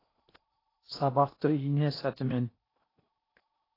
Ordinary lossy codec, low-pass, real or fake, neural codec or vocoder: AAC, 24 kbps; 5.4 kHz; fake; codec, 16 kHz in and 24 kHz out, 0.8 kbps, FocalCodec, streaming, 65536 codes